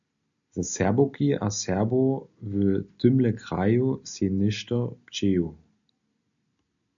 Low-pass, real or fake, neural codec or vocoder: 7.2 kHz; real; none